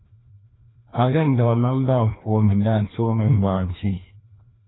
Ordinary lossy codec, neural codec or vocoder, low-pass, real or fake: AAC, 16 kbps; codec, 16 kHz, 1 kbps, FreqCodec, larger model; 7.2 kHz; fake